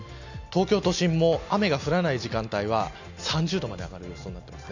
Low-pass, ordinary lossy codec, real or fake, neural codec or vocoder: 7.2 kHz; none; real; none